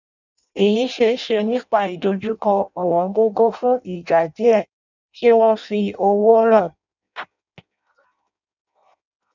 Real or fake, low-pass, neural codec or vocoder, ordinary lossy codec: fake; 7.2 kHz; codec, 16 kHz in and 24 kHz out, 0.6 kbps, FireRedTTS-2 codec; none